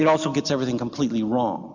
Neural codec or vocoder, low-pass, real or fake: none; 7.2 kHz; real